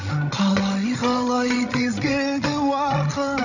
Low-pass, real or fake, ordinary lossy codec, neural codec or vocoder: 7.2 kHz; fake; none; codec, 16 kHz, 16 kbps, FreqCodec, larger model